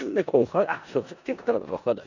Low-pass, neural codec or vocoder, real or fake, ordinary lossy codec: 7.2 kHz; codec, 16 kHz in and 24 kHz out, 0.4 kbps, LongCat-Audio-Codec, four codebook decoder; fake; none